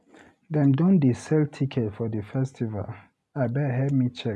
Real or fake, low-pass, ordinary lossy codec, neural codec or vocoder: real; none; none; none